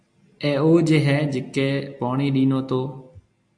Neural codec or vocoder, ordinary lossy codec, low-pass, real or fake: none; AAC, 64 kbps; 9.9 kHz; real